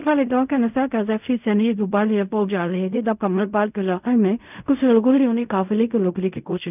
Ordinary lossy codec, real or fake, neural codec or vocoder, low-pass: none; fake; codec, 16 kHz in and 24 kHz out, 0.4 kbps, LongCat-Audio-Codec, fine tuned four codebook decoder; 3.6 kHz